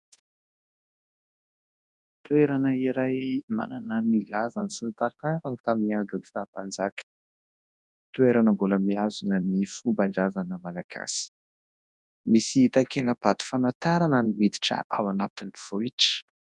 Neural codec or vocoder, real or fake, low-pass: codec, 24 kHz, 0.9 kbps, WavTokenizer, large speech release; fake; 10.8 kHz